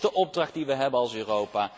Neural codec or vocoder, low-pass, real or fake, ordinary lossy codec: none; none; real; none